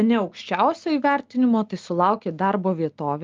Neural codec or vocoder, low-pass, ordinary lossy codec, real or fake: none; 7.2 kHz; Opus, 32 kbps; real